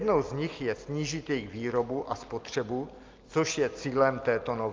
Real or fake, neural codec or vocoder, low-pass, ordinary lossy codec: real; none; 7.2 kHz; Opus, 24 kbps